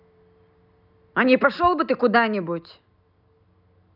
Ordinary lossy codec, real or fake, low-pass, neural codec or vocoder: none; real; 5.4 kHz; none